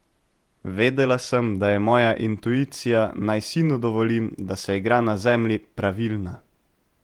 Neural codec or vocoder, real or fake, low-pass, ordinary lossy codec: vocoder, 48 kHz, 128 mel bands, Vocos; fake; 19.8 kHz; Opus, 24 kbps